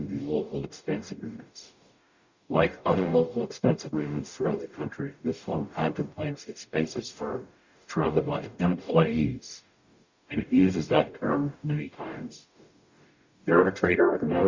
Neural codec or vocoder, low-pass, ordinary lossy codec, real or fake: codec, 44.1 kHz, 0.9 kbps, DAC; 7.2 kHz; Opus, 64 kbps; fake